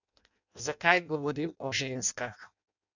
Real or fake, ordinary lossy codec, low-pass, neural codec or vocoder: fake; none; 7.2 kHz; codec, 16 kHz in and 24 kHz out, 0.6 kbps, FireRedTTS-2 codec